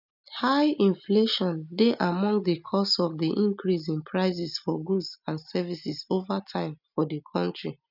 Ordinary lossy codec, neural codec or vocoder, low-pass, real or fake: none; none; 5.4 kHz; real